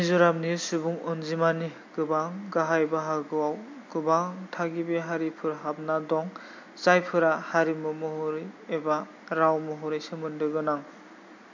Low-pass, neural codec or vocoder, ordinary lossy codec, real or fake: 7.2 kHz; none; MP3, 48 kbps; real